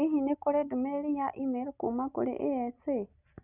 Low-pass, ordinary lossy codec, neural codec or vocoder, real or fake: 3.6 kHz; none; none; real